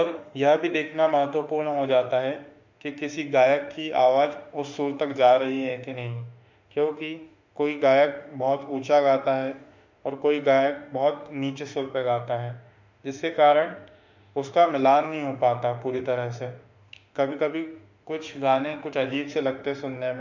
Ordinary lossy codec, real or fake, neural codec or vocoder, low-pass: MP3, 64 kbps; fake; autoencoder, 48 kHz, 32 numbers a frame, DAC-VAE, trained on Japanese speech; 7.2 kHz